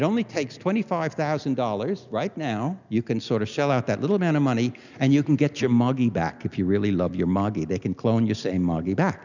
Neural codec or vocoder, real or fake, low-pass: none; real; 7.2 kHz